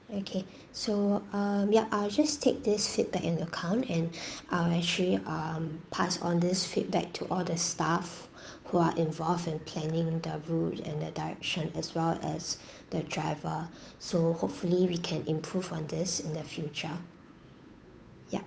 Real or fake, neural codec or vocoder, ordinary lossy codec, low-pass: fake; codec, 16 kHz, 8 kbps, FunCodec, trained on Chinese and English, 25 frames a second; none; none